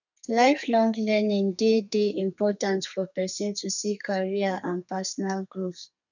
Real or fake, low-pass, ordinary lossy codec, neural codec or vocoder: fake; 7.2 kHz; none; codec, 32 kHz, 1.9 kbps, SNAC